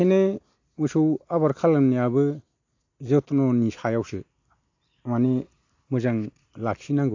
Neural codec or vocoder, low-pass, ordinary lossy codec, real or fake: none; 7.2 kHz; AAC, 48 kbps; real